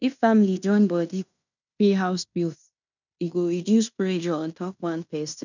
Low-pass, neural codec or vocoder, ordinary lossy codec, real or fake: 7.2 kHz; codec, 16 kHz in and 24 kHz out, 0.9 kbps, LongCat-Audio-Codec, fine tuned four codebook decoder; none; fake